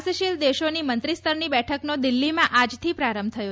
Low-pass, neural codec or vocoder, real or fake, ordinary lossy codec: none; none; real; none